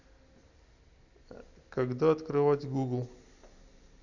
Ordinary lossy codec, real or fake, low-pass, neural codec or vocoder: none; real; 7.2 kHz; none